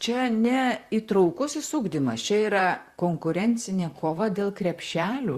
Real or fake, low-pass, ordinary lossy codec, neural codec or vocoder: fake; 14.4 kHz; Opus, 64 kbps; vocoder, 44.1 kHz, 128 mel bands, Pupu-Vocoder